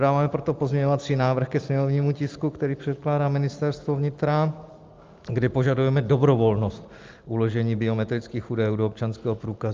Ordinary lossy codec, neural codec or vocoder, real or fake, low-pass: Opus, 32 kbps; none; real; 7.2 kHz